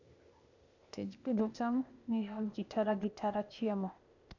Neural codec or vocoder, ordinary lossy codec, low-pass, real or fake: codec, 16 kHz, 0.8 kbps, ZipCodec; none; 7.2 kHz; fake